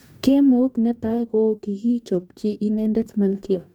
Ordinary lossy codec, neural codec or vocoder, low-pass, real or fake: MP3, 96 kbps; codec, 44.1 kHz, 2.6 kbps, DAC; 19.8 kHz; fake